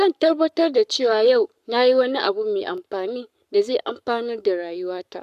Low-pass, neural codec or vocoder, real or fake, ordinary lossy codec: 14.4 kHz; codec, 44.1 kHz, 7.8 kbps, Pupu-Codec; fake; none